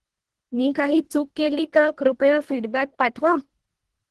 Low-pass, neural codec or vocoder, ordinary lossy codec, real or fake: 10.8 kHz; codec, 24 kHz, 1.5 kbps, HILCodec; Opus, 16 kbps; fake